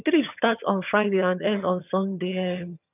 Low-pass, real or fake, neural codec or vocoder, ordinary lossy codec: 3.6 kHz; fake; vocoder, 22.05 kHz, 80 mel bands, HiFi-GAN; none